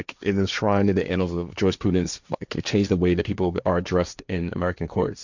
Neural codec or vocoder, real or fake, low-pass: codec, 16 kHz, 1.1 kbps, Voila-Tokenizer; fake; 7.2 kHz